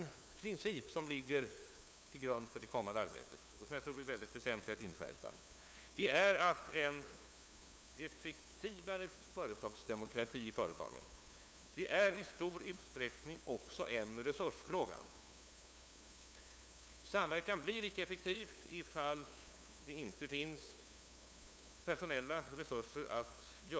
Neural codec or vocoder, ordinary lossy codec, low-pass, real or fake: codec, 16 kHz, 2 kbps, FunCodec, trained on LibriTTS, 25 frames a second; none; none; fake